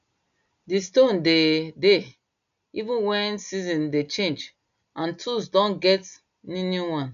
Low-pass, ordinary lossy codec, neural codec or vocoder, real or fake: 7.2 kHz; none; none; real